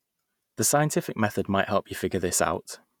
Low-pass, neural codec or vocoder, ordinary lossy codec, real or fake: none; vocoder, 48 kHz, 128 mel bands, Vocos; none; fake